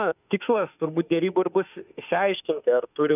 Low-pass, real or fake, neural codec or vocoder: 3.6 kHz; fake; autoencoder, 48 kHz, 32 numbers a frame, DAC-VAE, trained on Japanese speech